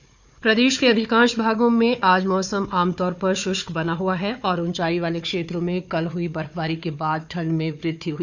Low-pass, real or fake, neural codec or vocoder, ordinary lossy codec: 7.2 kHz; fake; codec, 16 kHz, 4 kbps, FunCodec, trained on Chinese and English, 50 frames a second; none